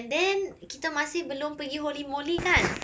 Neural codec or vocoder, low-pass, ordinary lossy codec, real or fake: none; none; none; real